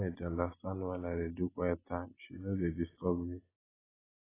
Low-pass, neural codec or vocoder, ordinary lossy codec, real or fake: 3.6 kHz; none; AAC, 16 kbps; real